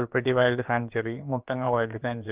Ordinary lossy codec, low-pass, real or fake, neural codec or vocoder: Opus, 24 kbps; 3.6 kHz; fake; codec, 16 kHz, about 1 kbps, DyCAST, with the encoder's durations